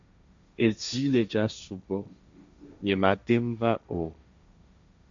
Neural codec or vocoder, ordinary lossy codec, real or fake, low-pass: codec, 16 kHz, 1.1 kbps, Voila-Tokenizer; MP3, 64 kbps; fake; 7.2 kHz